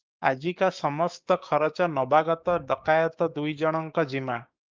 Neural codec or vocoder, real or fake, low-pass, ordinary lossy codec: codec, 16 kHz, 6 kbps, DAC; fake; 7.2 kHz; Opus, 24 kbps